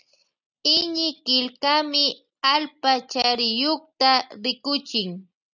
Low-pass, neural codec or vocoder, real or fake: 7.2 kHz; none; real